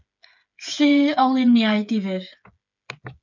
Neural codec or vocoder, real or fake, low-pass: codec, 16 kHz, 8 kbps, FreqCodec, smaller model; fake; 7.2 kHz